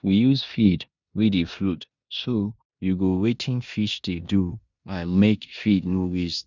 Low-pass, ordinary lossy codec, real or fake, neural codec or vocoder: 7.2 kHz; none; fake; codec, 16 kHz in and 24 kHz out, 0.9 kbps, LongCat-Audio-Codec, four codebook decoder